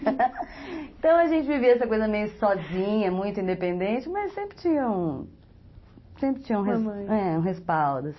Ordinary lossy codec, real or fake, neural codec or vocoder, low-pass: MP3, 24 kbps; real; none; 7.2 kHz